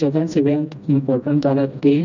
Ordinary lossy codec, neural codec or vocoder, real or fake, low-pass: none; codec, 16 kHz, 1 kbps, FreqCodec, smaller model; fake; 7.2 kHz